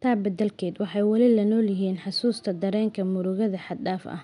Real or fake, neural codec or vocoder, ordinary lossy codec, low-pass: real; none; none; 10.8 kHz